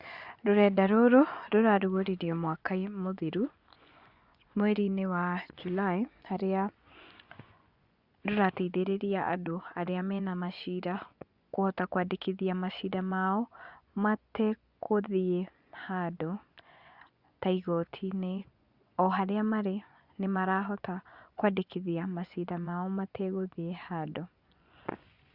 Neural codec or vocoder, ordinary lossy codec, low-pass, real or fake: vocoder, 24 kHz, 100 mel bands, Vocos; Opus, 64 kbps; 5.4 kHz; fake